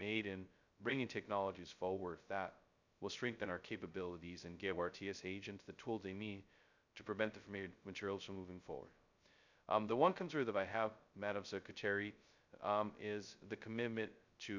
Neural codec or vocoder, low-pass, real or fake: codec, 16 kHz, 0.2 kbps, FocalCodec; 7.2 kHz; fake